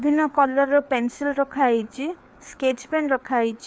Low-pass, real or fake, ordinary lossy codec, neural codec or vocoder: none; fake; none; codec, 16 kHz, 4 kbps, FreqCodec, larger model